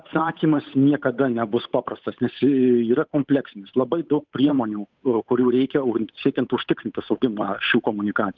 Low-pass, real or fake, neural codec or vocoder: 7.2 kHz; fake; codec, 16 kHz, 8 kbps, FunCodec, trained on Chinese and English, 25 frames a second